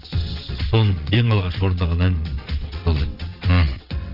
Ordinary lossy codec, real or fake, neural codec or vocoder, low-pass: none; fake; vocoder, 22.05 kHz, 80 mel bands, WaveNeXt; 5.4 kHz